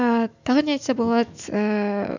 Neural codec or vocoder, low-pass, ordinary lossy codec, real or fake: autoencoder, 48 kHz, 128 numbers a frame, DAC-VAE, trained on Japanese speech; 7.2 kHz; none; fake